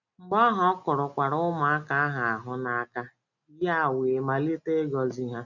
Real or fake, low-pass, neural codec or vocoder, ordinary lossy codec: real; 7.2 kHz; none; none